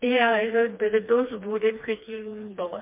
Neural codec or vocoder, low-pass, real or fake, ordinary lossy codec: codec, 16 kHz, 2 kbps, FreqCodec, smaller model; 3.6 kHz; fake; MP3, 32 kbps